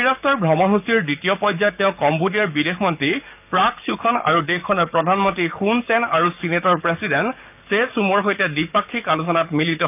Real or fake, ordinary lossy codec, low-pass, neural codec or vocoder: fake; none; 3.6 kHz; codec, 44.1 kHz, 7.8 kbps, DAC